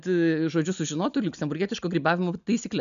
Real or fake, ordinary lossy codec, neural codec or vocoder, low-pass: real; MP3, 96 kbps; none; 7.2 kHz